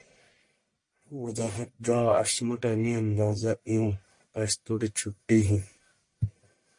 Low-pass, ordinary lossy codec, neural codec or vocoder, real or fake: 10.8 kHz; MP3, 48 kbps; codec, 44.1 kHz, 1.7 kbps, Pupu-Codec; fake